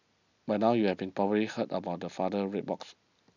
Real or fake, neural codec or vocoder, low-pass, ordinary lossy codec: real; none; 7.2 kHz; none